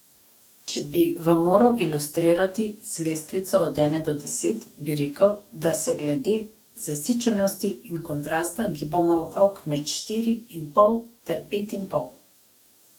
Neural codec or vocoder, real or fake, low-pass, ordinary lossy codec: codec, 44.1 kHz, 2.6 kbps, DAC; fake; 19.8 kHz; none